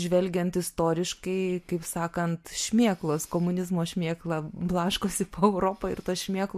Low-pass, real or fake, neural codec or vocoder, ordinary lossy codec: 14.4 kHz; real; none; MP3, 64 kbps